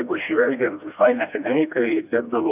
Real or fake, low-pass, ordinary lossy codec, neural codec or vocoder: fake; 3.6 kHz; AAC, 32 kbps; codec, 16 kHz, 1 kbps, FreqCodec, smaller model